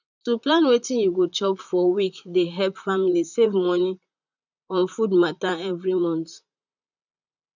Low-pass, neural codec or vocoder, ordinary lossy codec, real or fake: 7.2 kHz; vocoder, 44.1 kHz, 128 mel bands, Pupu-Vocoder; none; fake